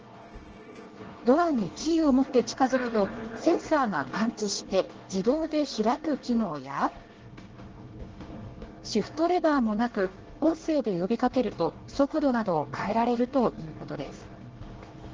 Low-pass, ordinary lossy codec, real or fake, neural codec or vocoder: 7.2 kHz; Opus, 16 kbps; fake; codec, 24 kHz, 1 kbps, SNAC